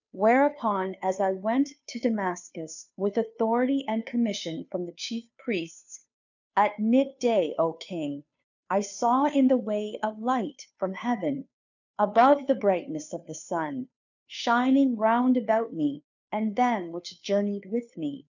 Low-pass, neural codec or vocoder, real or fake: 7.2 kHz; codec, 16 kHz, 2 kbps, FunCodec, trained on Chinese and English, 25 frames a second; fake